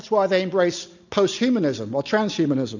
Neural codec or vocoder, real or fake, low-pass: none; real; 7.2 kHz